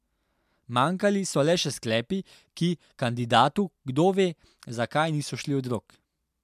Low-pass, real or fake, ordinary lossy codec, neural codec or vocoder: 14.4 kHz; real; MP3, 96 kbps; none